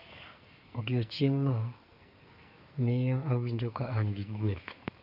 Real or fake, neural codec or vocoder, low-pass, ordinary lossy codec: fake; codec, 44.1 kHz, 2.6 kbps, SNAC; 5.4 kHz; none